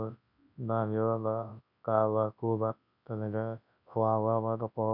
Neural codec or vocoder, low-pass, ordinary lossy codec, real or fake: codec, 24 kHz, 0.9 kbps, WavTokenizer, large speech release; 5.4 kHz; none; fake